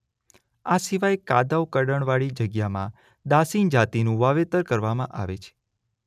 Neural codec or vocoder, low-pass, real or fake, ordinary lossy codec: none; 14.4 kHz; real; none